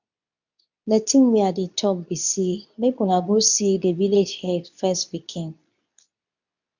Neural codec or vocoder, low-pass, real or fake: codec, 24 kHz, 0.9 kbps, WavTokenizer, medium speech release version 2; 7.2 kHz; fake